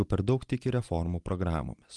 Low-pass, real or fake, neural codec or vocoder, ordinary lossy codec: 10.8 kHz; real; none; Opus, 24 kbps